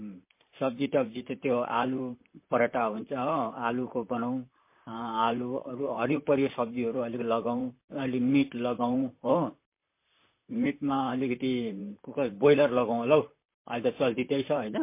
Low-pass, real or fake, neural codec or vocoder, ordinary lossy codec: 3.6 kHz; fake; vocoder, 44.1 kHz, 128 mel bands, Pupu-Vocoder; MP3, 24 kbps